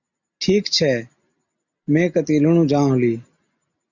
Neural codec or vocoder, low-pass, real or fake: none; 7.2 kHz; real